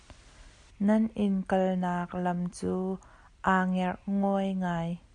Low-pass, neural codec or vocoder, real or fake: 9.9 kHz; none; real